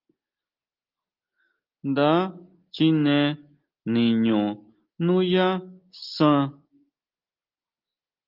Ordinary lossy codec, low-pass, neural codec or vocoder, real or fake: Opus, 32 kbps; 5.4 kHz; none; real